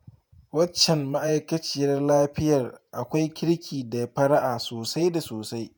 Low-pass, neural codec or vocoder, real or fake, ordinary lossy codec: none; vocoder, 48 kHz, 128 mel bands, Vocos; fake; none